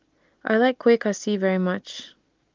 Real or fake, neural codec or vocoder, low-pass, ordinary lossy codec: real; none; 7.2 kHz; Opus, 32 kbps